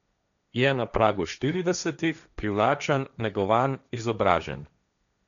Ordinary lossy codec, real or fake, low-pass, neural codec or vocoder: none; fake; 7.2 kHz; codec, 16 kHz, 1.1 kbps, Voila-Tokenizer